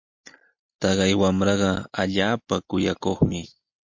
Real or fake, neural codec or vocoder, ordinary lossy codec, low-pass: real; none; MP3, 48 kbps; 7.2 kHz